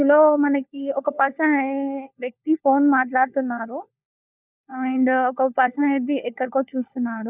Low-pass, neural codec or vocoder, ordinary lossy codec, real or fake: 3.6 kHz; codec, 16 kHz, 4 kbps, FunCodec, trained on LibriTTS, 50 frames a second; none; fake